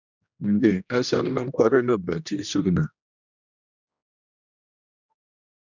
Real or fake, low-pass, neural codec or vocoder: fake; 7.2 kHz; codec, 16 kHz, 1 kbps, X-Codec, HuBERT features, trained on general audio